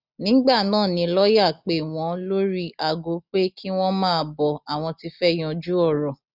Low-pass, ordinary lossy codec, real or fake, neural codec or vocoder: 5.4 kHz; none; real; none